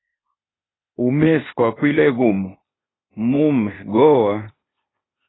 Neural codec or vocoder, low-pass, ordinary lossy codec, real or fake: codec, 16 kHz, 0.8 kbps, ZipCodec; 7.2 kHz; AAC, 16 kbps; fake